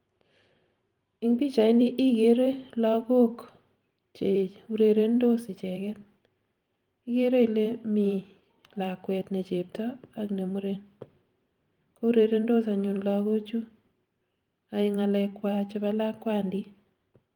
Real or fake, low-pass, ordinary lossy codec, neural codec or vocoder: fake; 19.8 kHz; Opus, 32 kbps; vocoder, 44.1 kHz, 128 mel bands every 512 samples, BigVGAN v2